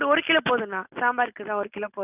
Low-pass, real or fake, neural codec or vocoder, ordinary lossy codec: 3.6 kHz; real; none; none